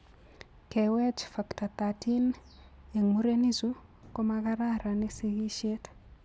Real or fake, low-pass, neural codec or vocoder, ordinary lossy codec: real; none; none; none